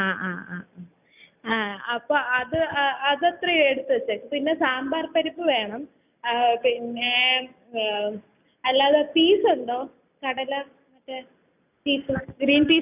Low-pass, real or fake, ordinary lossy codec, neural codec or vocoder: 3.6 kHz; real; none; none